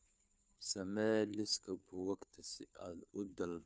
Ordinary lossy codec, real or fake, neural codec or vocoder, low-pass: none; fake; codec, 16 kHz, 2 kbps, FunCodec, trained on Chinese and English, 25 frames a second; none